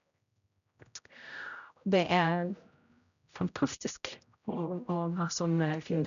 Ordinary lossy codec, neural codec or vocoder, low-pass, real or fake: none; codec, 16 kHz, 0.5 kbps, X-Codec, HuBERT features, trained on general audio; 7.2 kHz; fake